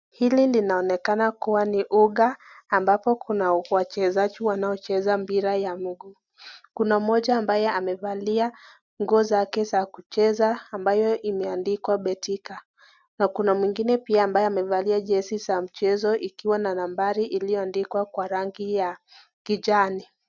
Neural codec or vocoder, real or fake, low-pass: none; real; 7.2 kHz